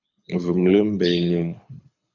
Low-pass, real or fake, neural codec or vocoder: 7.2 kHz; fake; codec, 24 kHz, 6 kbps, HILCodec